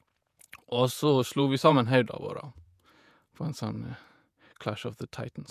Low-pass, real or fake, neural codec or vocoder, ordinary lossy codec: 14.4 kHz; fake; vocoder, 48 kHz, 128 mel bands, Vocos; none